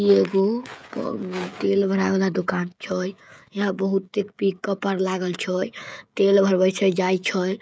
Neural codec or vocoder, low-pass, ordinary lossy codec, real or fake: codec, 16 kHz, 16 kbps, FreqCodec, smaller model; none; none; fake